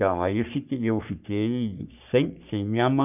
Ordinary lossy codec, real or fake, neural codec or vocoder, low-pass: none; fake; codec, 44.1 kHz, 3.4 kbps, Pupu-Codec; 3.6 kHz